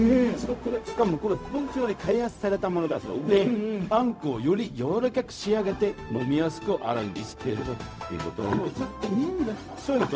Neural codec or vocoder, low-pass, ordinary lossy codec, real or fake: codec, 16 kHz, 0.4 kbps, LongCat-Audio-Codec; none; none; fake